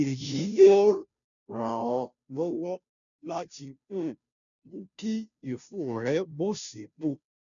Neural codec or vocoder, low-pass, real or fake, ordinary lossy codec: codec, 16 kHz, 0.5 kbps, FunCodec, trained on Chinese and English, 25 frames a second; 7.2 kHz; fake; none